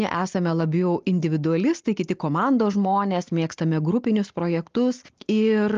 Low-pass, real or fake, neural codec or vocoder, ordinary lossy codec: 7.2 kHz; real; none; Opus, 32 kbps